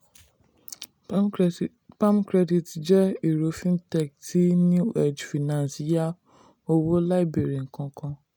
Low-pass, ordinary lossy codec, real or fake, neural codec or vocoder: none; none; real; none